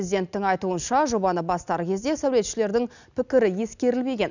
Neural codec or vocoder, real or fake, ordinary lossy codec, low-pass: none; real; none; 7.2 kHz